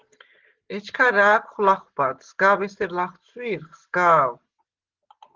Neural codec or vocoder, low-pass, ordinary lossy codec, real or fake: none; 7.2 kHz; Opus, 16 kbps; real